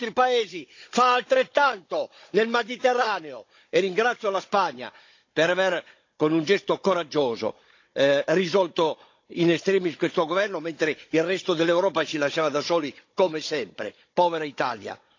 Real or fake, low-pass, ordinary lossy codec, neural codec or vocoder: fake; 7.2 kHz; AAC, 48 kbps; codec, 16 kHz, 16 kbps, FunCodec, trained on Chinese and English, 50 frames a second